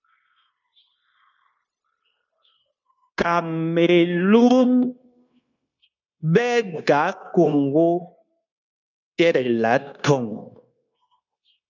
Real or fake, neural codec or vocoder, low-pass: fake; codec, 16 kHz, 0.9 kbps, LongCat-Audio-Codec; 7.2 kHz